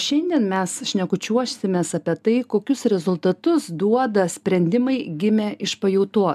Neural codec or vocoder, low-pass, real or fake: none; 14.4 kHz; real